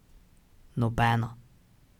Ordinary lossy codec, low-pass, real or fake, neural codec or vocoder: none; 19.8 kHz; real; none